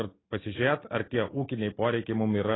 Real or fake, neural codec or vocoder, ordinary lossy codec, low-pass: real; none; AAC, 16 kbps; 7.2 kHz